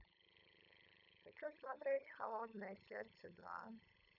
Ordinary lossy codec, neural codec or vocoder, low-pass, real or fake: none; codec, 16 kHz, 8 kbps, FunCodec, trained on LibriTTS, 25 frames a second; 5.4 kHz; fake